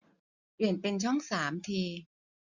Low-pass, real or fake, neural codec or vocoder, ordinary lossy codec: 7.2 kHz; real; none; none